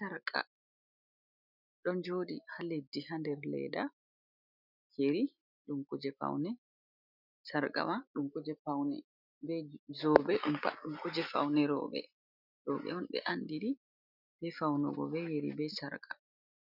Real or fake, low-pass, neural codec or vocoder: real; 5.4 kHz; none